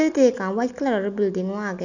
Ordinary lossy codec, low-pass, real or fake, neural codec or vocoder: none; 7.2 kHz; real; none